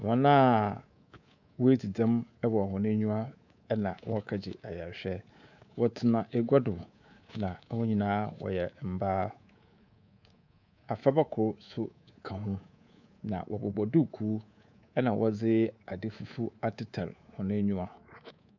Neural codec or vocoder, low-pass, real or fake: codec, 24 kHz, 3.1 kbps, DualCodec; 7.2 kHz; fake